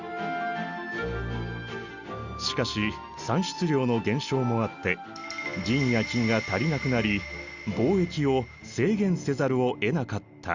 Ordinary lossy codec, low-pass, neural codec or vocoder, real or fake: Opus, 64 kbps; 7.2 kHz; none; real